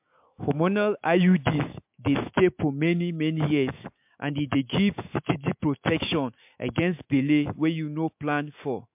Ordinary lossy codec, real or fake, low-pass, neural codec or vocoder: MP3, 32 kbps; fake; 3.6 kHz; autoencoder, 48 kHz, 128 numbers a frame, DAC-VAE, trained on Japanese speech